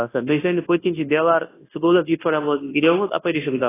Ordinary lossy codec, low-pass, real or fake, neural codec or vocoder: AAC, 16 kbps; 3.6 kHz; fake; codec, 24 kHz, 0.9 kbps, WavTokenizer, large speech release